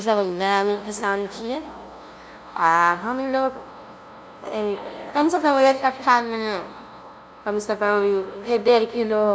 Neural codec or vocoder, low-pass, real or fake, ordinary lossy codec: codec, 16 kHz, 0.5 kbps, FunCodec, trained on LibriTTS, 25 frames a second; none; fake; none